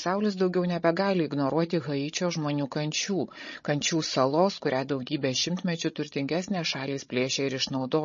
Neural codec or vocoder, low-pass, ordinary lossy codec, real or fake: codec, 16 kHz, 16 kbps, FreqCodec, larger model; 7.2 kHz; MP3, 32 kbps; fake